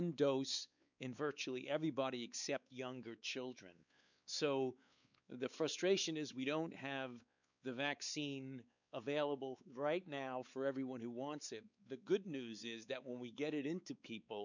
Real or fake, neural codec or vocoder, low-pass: fake; codec, 16 kHz, 4 kbps, X-Codec, WavLM features, trained on Multilingual LibriSpeech; 7.2 kHz